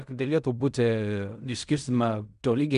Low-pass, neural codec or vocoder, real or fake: 10.8 kHz; codec, 16 kHz in and 24 kHz out, 0.4 kbps, LongCat-Audio-Codec, fine tuned four codebook decoder; fake